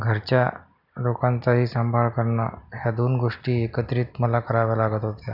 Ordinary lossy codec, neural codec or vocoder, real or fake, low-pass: none; none; real; 5.4 kHz